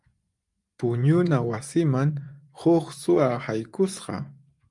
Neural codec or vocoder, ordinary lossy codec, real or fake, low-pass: vocoder, 24 kHz, 100 mel bands, Vocos; Opus, 32 kbps; fake; 10.8 kHz